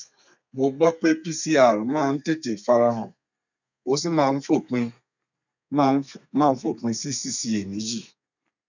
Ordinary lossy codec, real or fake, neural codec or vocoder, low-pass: none; fake; codec, 32 kHz, 1.9 kbps, SNAC; 7.2 kHz